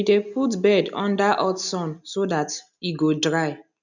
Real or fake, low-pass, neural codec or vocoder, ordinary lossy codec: real; 7.2 kHz; none; none